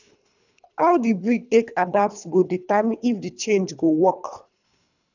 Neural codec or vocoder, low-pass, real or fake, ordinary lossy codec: codec, 24 kHz, 3 kbps, HILCodec; 7.2 kHz; fake; none